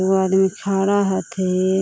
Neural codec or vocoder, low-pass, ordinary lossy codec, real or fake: none; none; none; real